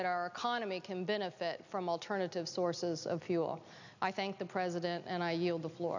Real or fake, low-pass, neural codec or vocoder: real; 7.2 kHz; none